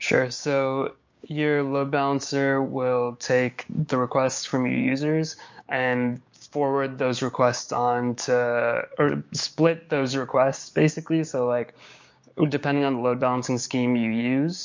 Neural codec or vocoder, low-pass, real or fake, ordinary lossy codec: codec, 16 kHz, 6 kbps, DAC; 7.2 kHz; fake; MP3, 48 kbps